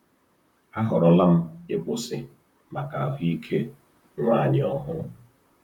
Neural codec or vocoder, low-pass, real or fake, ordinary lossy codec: vocoder, 44.1 kHz, 128 mel bands, Pupu-Vocoder; 19.8 kHz; fake; none